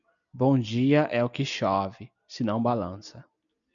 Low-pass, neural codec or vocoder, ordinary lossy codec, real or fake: 7.2 kHz; none; MP3, 96 kbps; real